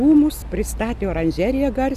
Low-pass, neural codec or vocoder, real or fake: 14.4 kHz; none; real